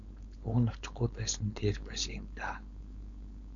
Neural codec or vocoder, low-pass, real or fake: codec, 16 kHz, 4.8 kbps, FACodec; 7.2 kHz; fake